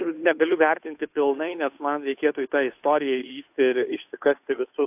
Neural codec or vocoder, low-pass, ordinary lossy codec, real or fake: codec, 16 kHz, 2 kbps, FunCodec, trained on Chinese and English, 25 frames a second; 3.6 kHz; AAC, 32 kbps; fake